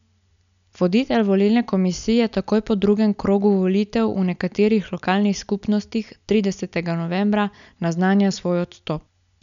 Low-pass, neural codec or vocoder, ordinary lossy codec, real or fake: 7.2 kHz; none; none; real